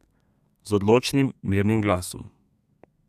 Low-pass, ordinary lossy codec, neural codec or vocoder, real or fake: 14.4 kHz; none; codec, 32 kHz, 1.9 kbps, SNAC; fake